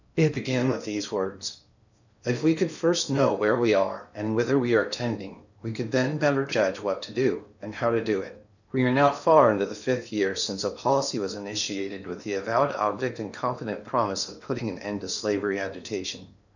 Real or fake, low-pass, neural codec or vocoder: fake; 7.2 kHz; codec, 16 kHz in and 24 kHz out, 0.8 kbps, FocalCodec, streaming, 65536 codes